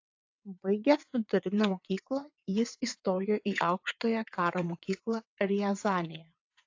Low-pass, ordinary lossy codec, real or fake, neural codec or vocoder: 7.2 kHz; AAC, 48 kbps; fake; vocoder, 22.05 kHz, 80 mel bands, WaveNeXt